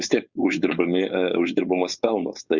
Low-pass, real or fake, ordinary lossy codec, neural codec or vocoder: 7.2 kHz; fake; Opus, 64 kbps; codec, 16 kHz, 4.8 kbps, FACodec